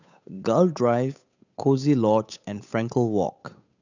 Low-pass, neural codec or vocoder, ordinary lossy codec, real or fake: 7.2 kHz; codec, 16 kHz, 8 kbps, FunCodec, trained on Chinese and English, 25 frames a second; none; fake